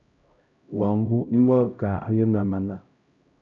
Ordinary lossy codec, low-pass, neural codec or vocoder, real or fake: Opus, 64 kbps; 7.2 kHz; codec, 16 kHz, 0.5 kbps, X-Codec, HuBERT features, trained on LibriSpeech; fake